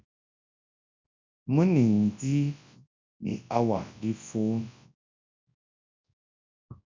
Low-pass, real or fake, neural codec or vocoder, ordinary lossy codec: 7.2 kHz; fake; codec, 24 kHz, 0.9 kbps, WavTokenizer, large speech release; MP3, 64 kbps